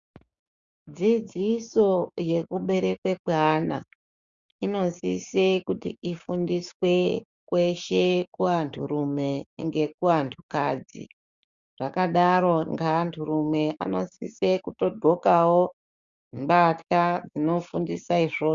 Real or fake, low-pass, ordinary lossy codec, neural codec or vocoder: fake; 7.2 kHz; Opus, 64 kbps; codec, 16 kHz, 6 kbps, DAC